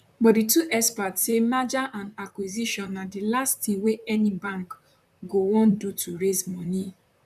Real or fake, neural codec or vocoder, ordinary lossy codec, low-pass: fake; vocoder, 44.1 kHz, 128 mel bands, Pupu-Vocoder; none; 14.4 kHz